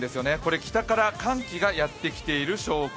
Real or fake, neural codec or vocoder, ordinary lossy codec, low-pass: real; none; none; none